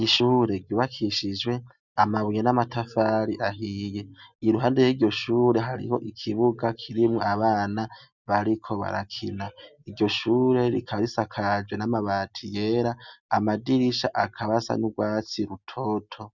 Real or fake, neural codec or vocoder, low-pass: real; none; 7.2 kHz